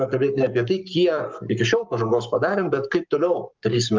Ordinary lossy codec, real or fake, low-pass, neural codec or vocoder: Opus, 24 kbps; fake; 7.2 kHz; codec, 44.1 kHz, 7.8 kbps, Pupu-Codec